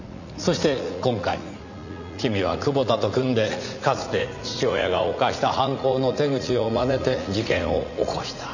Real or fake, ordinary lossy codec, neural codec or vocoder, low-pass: fake; none; vocoder, 44.1 kHz, 80 mel bands, Vocos; 7.2 kHz